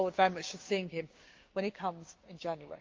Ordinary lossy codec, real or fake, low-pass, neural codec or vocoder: Opus, 24 kbps; fake; 7.2 kHz; codec, 16 kHz, 6 kbps, DAC